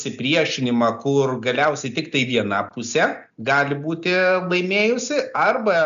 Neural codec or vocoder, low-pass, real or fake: none; 7.2 kHz; real